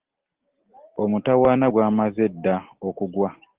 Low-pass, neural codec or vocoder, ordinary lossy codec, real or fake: 3.6 kHz; none; Opus, 16 kbps; real